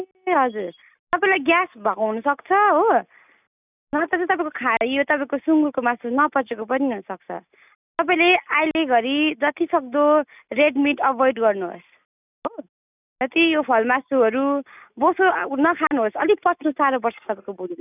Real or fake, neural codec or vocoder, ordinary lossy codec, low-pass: real; none; none; 3.6 kHz